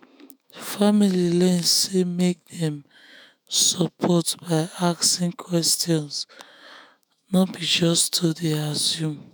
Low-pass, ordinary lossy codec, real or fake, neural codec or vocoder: none; none; fake; autoencoder, 48 kHz, 128 numbers a frame, DAC-VAE, trained on Japanese speech